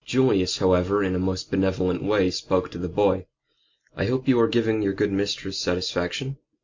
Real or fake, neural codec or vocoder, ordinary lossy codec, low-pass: real; none; MP3, 48 kbps; 7.2 kHz